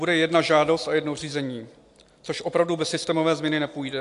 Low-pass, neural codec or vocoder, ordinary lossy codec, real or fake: 10.8 kHz; none; AAC, 64 kbps; real